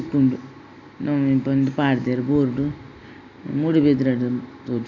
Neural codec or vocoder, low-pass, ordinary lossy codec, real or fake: none; 7.2 kHz; none; real